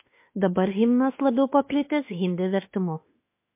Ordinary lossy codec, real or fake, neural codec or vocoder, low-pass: MP3, 24 kbps; fake; autoencoder, 48 kHz, 32 numbers a frame, DAC-VAE, trained on Japanese speech; 3.6 kHz